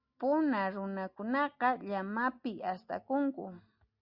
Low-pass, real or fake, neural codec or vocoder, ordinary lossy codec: 5.4 kHz; real; none; Opus, 64 kbps